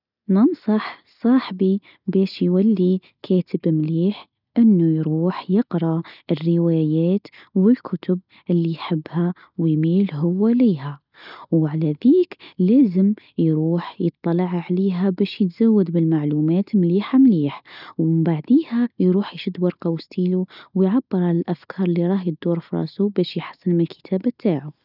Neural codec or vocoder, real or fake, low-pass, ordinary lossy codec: none; real; 5.4 kHz; none